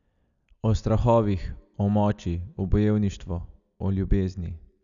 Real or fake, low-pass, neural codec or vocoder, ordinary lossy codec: real; 7.2 kHz; none; none